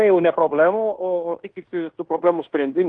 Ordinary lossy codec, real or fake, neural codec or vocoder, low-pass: Opus, 24 kbps; fake; codec, 16 kHz in and 24 kHz out, 0.9 kbps, LongCat-Audio-Codec, fine tuned four codebook decoder; 9.9 kHz